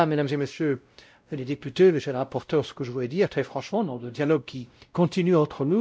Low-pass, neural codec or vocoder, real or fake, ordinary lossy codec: none; codec, 16 kHz, 0.5 kbps, X-Codec, WavLM features, trained on Multilingual LibriSpeech; fake; none